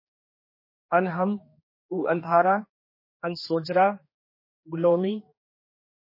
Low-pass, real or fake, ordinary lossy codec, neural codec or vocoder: 5.4 kHz; fake; MP3, 24 kbps; codec, 16 kHz, 2 kbps, X-Codec, HuBERT features, trained on general audio